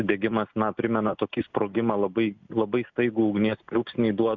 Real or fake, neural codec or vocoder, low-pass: real; none; 7.2 kHz